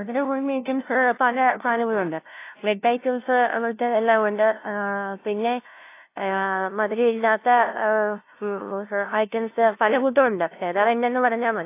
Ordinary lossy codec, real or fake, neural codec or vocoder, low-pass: AAC, 24 kbps; fake; codec, 16 kHz, 0.5 kbps, FunCodec, trained on LibriTTS, 25 frames a second; 3.6 kHz